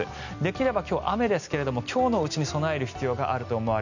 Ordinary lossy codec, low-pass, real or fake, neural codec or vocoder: none; 7.2 kHz; real; none